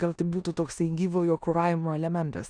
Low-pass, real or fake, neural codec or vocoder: 9.9 kHz; fake; codec, 16 kHz in and 24 kHz out, 0.9 kbps, LongCat-Audio-Codec, four codebook decoder